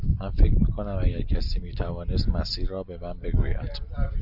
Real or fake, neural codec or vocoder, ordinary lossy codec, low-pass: real; none; MP3, 48 kbps; 5.4 kHz